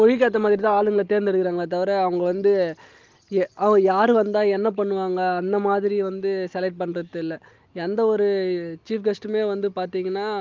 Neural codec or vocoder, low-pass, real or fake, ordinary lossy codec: none; 7.2 kHz; real; Opus, 24 kbps